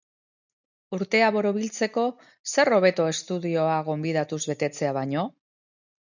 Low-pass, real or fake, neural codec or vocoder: 7.2 kHz; real; none